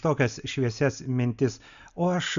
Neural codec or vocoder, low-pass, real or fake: none; 7.2 kHz; real